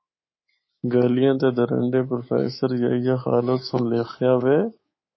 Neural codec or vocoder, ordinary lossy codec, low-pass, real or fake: codec, 24 kHz, 3.1 kbps, DualCodec; MP3, 24 kbps; 7.2 kHz; fake